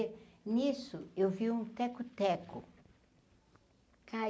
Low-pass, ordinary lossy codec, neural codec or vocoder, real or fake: none; none; none; real